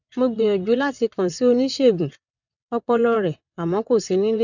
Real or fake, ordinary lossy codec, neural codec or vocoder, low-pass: fake; none; vocoder, 22.05 kHz, 80 mel bands, WaveNeXt; 7.2 kHz